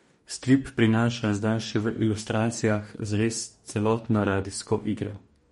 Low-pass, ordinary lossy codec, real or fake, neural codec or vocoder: 19.8 kHz; MP3, 48 kbps; fake; codec, 44.1 kHz, 2.6 kbps, DAC